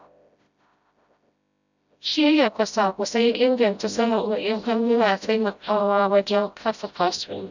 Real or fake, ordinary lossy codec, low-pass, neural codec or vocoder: fake; none; 7.2 kHz; codec, 16 kHz, 0.5 kbps, FreqCodec, smaller model